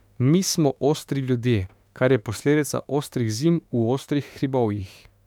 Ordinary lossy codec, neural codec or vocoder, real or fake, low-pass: none; autoencoder, 48 kHz, 32 numbers a frame, DAC-VAE, trained on Japanese speech; fake; 19.8 kHz